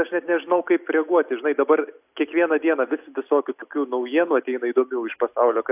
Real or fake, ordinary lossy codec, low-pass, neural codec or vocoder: real; AAC, 32 kbps; 3.6 kHz; none